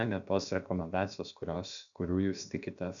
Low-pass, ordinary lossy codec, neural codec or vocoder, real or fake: 7.2 kHz; MP3, 96 kbps; codec, 16 kHz, about 1 kbps, DyCAST, with the encoder's durations; fake